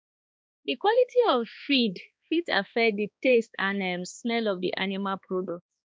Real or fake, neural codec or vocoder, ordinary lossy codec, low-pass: fake; codec, 16 kHz, 2 kbps, X-Codec, HuBERT features, trained on balanced general audio; none; none